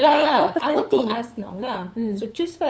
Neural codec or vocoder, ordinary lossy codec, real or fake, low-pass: codec, 16 kHz, 8 kbps, FunCodec, trained on LibriTTS, 25 frames a second; none; fake; none